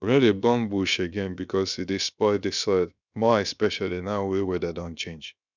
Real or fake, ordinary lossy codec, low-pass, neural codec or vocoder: fake; none; 7.2 kHz; codec, 16 kHz, about 1 kbps, DyCAST, with the encoder's durations